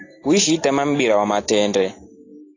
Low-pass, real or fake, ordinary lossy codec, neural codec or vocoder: 7.2 kHz; real; AAC, 32 kbps; none